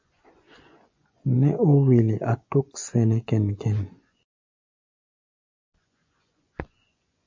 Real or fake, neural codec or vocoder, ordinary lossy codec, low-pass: fake; vocoder, 24 kHz, 100 mel bands, Vocos; MP3, 64 kbps; 7.2 kHz